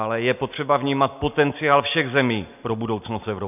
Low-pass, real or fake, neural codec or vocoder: 3.6 kHz; real; none